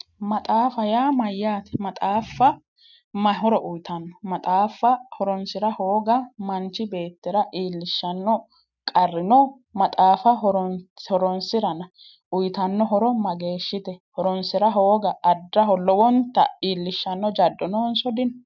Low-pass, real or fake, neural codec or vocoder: 7.2 kHz; real; none